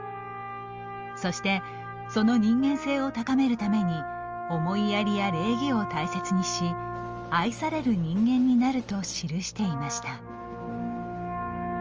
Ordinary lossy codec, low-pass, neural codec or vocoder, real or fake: Opus, 24 kbps; 7.2 kHz; none; real